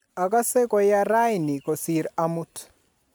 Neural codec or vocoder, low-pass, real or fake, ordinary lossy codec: none; none; real; none